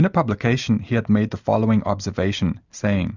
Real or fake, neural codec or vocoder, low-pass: real; none; 7.2 kHz